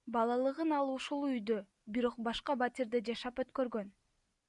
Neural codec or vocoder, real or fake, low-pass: none; real; 10.8 kHz